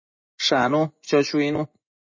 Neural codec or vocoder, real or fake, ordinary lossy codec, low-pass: vocoder, 24 kHz, 100 mel bands, Vocos; fake; MP3, 32 kbps; 7.2 kHz